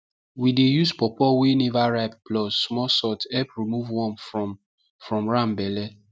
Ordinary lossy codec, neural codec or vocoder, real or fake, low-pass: none; none; real; none